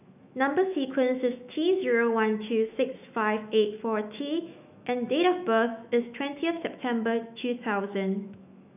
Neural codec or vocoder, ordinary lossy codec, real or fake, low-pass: autoencoder, 48 kHz, 128 numbers a frame, DAC-VAE, trained on Japanese speech; none; fake; 3.6 kHz